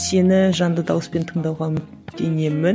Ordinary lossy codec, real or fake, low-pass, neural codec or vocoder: none; real; none; none